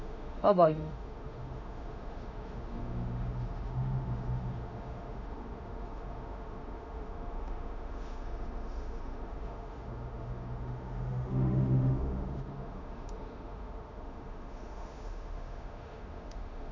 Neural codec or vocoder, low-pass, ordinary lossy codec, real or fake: autoencoder, 48 kHz, 32 numbers a frame, DAC-VAE, trained on Japanese speech; 7.2 kHz; none; fake